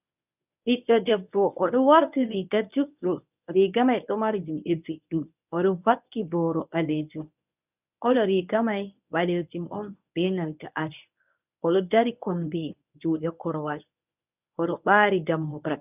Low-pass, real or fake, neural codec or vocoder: 3.6 kHz; fake; codec, 24 kHz, 0.9 kbps, WavTokenizer, medium speech release version 1